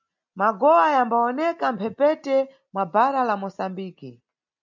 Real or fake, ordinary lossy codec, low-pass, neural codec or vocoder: real; MP3, 64 kbps; 7.2 kHz; none